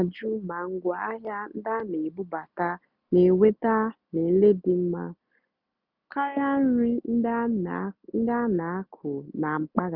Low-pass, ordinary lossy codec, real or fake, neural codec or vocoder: 5.4 kHz; none; real; none